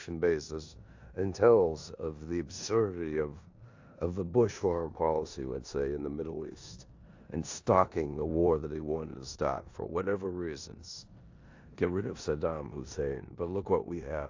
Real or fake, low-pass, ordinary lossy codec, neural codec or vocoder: fake; 7.2 kHz; AAC, 48 kbps; codec, 16 kHz in and 24 kHz out, 0.9 kbps, LongCat-Audio-Codec, four codebook decoder